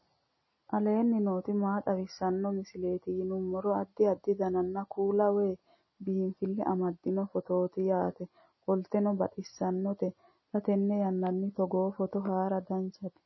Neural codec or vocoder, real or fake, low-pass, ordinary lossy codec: none; real; 7.2 kHz; MP3, 24 kbps